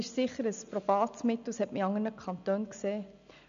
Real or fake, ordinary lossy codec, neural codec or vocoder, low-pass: real; none; none; 7.2 kHz